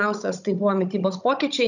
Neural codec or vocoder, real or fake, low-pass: codec, 16 kHz, 16 kbps, FunCodec, trained on Chinese and English, 50 frames a second; fake; 7.2 kHz